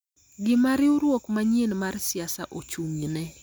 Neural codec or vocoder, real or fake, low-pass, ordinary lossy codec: none; real; none; none